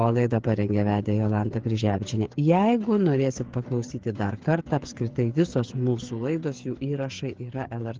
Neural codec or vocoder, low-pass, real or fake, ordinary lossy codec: codec, 16 kHz, 8 kbps, FreqCodec, smaller model; 7.2 kHz; fake; Opus, 16 kbps